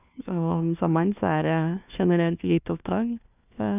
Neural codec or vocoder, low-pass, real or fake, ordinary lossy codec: codec, 16 kHz, 1 kbps, FunCodec, trained on LibriTTS, 50 frames a second; 3.6 kHz; fake; none